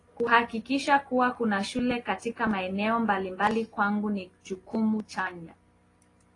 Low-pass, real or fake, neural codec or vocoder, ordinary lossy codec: 10.8 kHz; real; none; AAC, 32 kbps